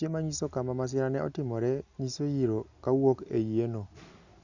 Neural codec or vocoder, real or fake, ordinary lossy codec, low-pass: none; real; Opus, 64 kbps; 7.2 kHz